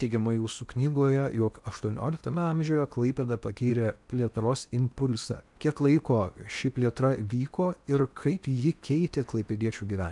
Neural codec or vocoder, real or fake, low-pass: codec, 16 kHz in and 24 kHz out, 0.8 kbps, FocalCodec, streaming, 65536 codes; fake; 10.8 kHz